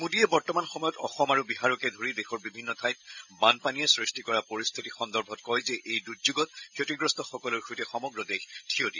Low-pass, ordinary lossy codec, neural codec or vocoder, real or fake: 7.2 kHz; none; none; real